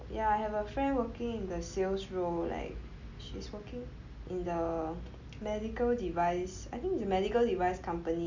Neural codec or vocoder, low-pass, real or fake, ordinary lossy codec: none; 7.2 kHz; real; MP3, 64 kbps